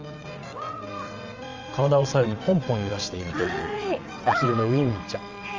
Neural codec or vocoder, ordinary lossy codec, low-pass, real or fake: vocoder, 22.05 kHz, 80 mel bands, WaveNeXt; Opus, 32 kbps; 7.2 kHz; fake